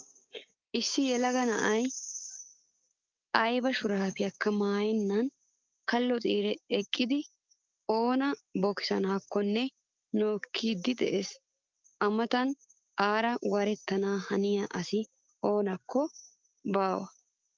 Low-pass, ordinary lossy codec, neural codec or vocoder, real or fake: 7.2 kHz; Opus, 24 kbps; codec, 16 kHz, 6 kbps, DAC; fake